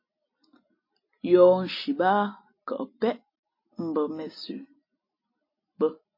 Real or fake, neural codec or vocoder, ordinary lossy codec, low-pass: real; none; MP3, 24 kbps; 5.4 kHz